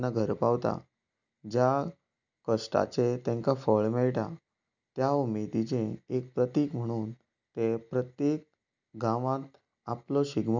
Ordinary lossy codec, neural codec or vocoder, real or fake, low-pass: none; none; real; 7.2 kHz